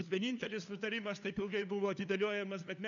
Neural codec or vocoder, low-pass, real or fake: codec, 16 kHz, 2 kbps, FunCodec, trained on LibriTTS, 25 frames a second; 7.2 kHz; fake